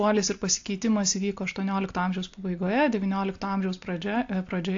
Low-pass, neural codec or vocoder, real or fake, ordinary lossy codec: 7.2 kHz; none; real; AAC, 48 kbps